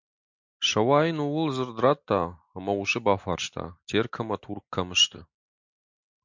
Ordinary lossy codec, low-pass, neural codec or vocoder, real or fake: MP3, 64 kbps; 7.2 kHz; none; real